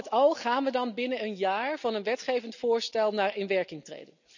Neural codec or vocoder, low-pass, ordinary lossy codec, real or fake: none; 7.2 kHz; none; real